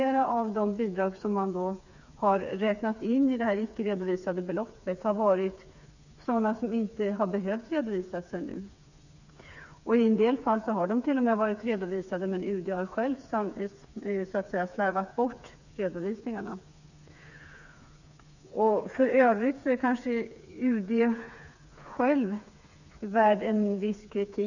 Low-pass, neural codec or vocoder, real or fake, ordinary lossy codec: 7.2 kHz; codec, 16 kHz, 4 kbps, FreqCodec, smaller model; fake; none